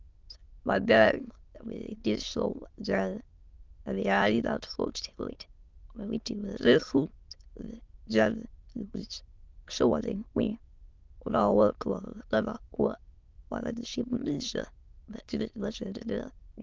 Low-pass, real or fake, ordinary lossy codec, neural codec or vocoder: 7.2 kHz; fake; Opus, 24 kbps; autoencoder, 22.05 kHz, a latent of 192 numbers a frame, VITS, trained on many speakers